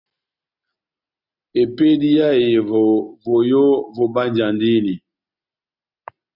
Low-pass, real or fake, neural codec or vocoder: 5.4 kHz; real; none